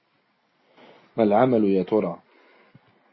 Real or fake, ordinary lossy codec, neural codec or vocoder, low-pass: real; MP3, 24 kbps; none; 7.2 kHz